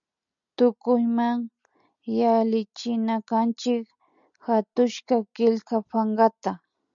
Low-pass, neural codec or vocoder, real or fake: 7.2 kHz; none; real